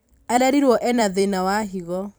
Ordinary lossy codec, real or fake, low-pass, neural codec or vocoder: none; fake; none; vocoder, 44.1 kHz, 128 mel bands every 256 samples, BigVGAN v2